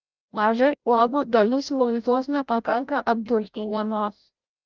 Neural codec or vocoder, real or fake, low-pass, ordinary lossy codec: codec, 16 kHz, 0.5 kbps, FreqCodec, larger model; fake; 7.2 kHz; Opus, 24 kbps